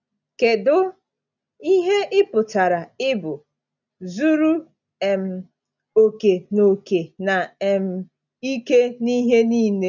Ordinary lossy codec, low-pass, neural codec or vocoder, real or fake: none; 7.2 kHz; none; real